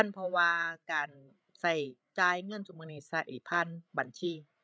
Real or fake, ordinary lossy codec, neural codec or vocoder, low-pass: fake; none; codec, 16 kHz, 16 kbps, FreqCodec, larger model; none